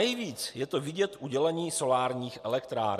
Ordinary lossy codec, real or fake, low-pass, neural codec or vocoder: MP3, 64 kbps; real; 14.4 kHz; none